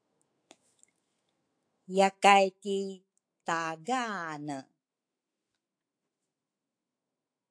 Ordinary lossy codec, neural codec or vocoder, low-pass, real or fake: AAC, 48 kbps; autoencoder, 48 kHz, 128 numbers a frame, DAC-VAE, trained on Japanese speech; 9.9 kHz; fake